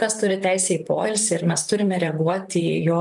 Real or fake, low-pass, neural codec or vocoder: fake; 10.8 kHz; vocoder, 44.1 kHz, 128 mel bands, Pupu-Vocoder